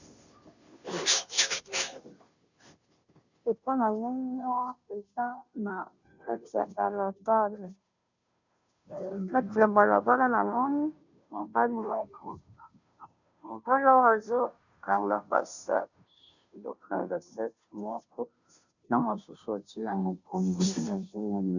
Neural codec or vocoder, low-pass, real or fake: codec, 16 kHz, 0.5 kbps, FunCodec, trained on Chinese and English, 25 frames a second; 7.2 kHz; fake